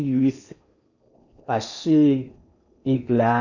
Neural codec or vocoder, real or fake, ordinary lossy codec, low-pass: codec, 16 kHz in and 24 kHz out, 0.6 kbps, FocalCodec, streaming, 4096 codes; fake; none; 7.2 kHz